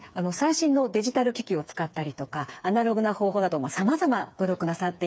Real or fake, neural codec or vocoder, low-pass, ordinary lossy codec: fake; codec, 16 kHz, 4 kbps, FreqCodec, smaller model; none; none